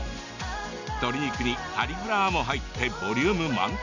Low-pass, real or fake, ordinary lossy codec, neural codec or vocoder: 7.2 kHz; real; none; none